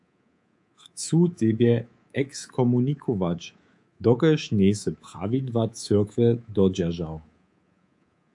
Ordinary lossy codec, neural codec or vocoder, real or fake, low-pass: AAC, 64 kbps; codec, 24 kHz, 3.1 kbps, DualCodec; fake; 10.8 kHz